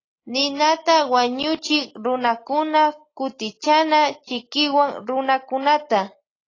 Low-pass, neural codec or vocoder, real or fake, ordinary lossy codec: 7.2 kHz; none; real; AAC, 32 kbps